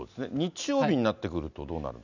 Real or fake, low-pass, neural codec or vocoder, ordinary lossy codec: real; 7.2 kHz; none; none